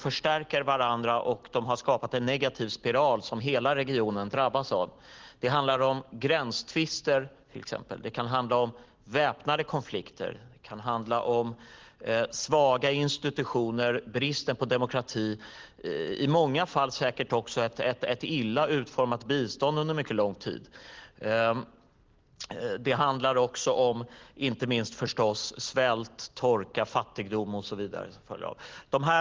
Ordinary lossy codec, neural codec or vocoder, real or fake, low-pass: Opus, 16 kbps; none; real; 7.2 kHz